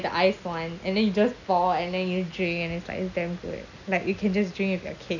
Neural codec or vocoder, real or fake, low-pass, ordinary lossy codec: none; real; 7.2 kHz; none